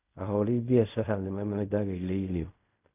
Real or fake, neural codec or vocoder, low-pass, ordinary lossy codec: fake; codec, 16 kHz in and 24 kHz out, 0.4 kbps, LongCat-Audio-Codec, fine tuned four codebook decoder; 3.6 kHz; none